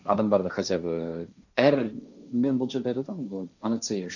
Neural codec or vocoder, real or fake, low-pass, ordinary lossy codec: codec, 16 kHz, 1.1 kbps, Voila-Tokenizer; fake; 7.2 kHz; none